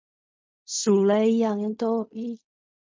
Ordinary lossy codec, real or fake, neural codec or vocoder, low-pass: MP3, 48 kbps; fake; codec, 16 kHz in and 24 kHz out, 0.4 kbps, LongCat-Audio-Codec, fine tuned four codebook decoder; 7.2 kHz